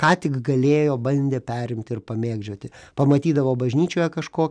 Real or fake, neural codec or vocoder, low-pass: real; none; 9.9 kHz